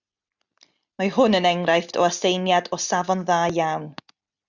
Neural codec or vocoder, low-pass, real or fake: none; 7.2 kHz; real